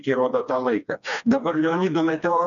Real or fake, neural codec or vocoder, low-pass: fake; codec, 16 kHz, 2 kbps, FreqCodec, smaller model; 7.2 kHz